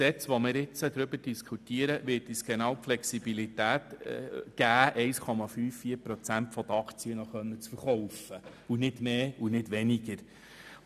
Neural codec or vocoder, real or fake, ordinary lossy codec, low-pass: none; real; none; 14.4 kHz